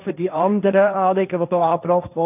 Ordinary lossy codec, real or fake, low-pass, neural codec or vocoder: none; fake; 3.6 kHz; codec, 16 kHz, 1.1 kbps, Voila-Tokenizer